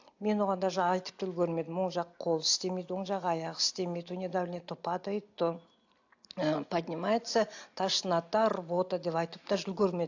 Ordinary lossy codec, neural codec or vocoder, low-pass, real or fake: AAC, 48 kbps; none; 7.2 kHz; real